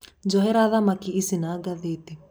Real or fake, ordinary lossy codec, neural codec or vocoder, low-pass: real; none; none; none